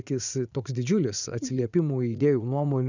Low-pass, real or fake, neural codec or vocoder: 7.2 kHz; fake; vocoder, 44.1 kHz, 80 mel bands, Vocos